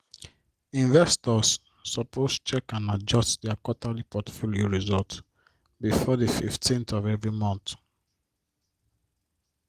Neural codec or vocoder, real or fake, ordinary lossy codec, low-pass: none; real; Opus, 24 kbps; 14.4 kHz